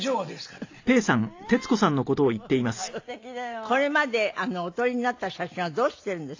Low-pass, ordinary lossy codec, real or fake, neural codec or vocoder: 7.2 kHz; AAC, 48 kbps; real; none